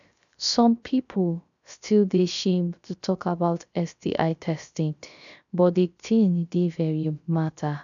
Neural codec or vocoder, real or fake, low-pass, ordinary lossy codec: codec, 16 kHz, 0.3 kbps, FocalCodec; fake; 7.2 kHz; none